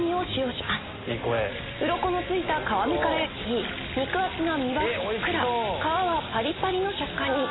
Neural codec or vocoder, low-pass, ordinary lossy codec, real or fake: none; 7.2 kHz; AAC, 16 kbps; real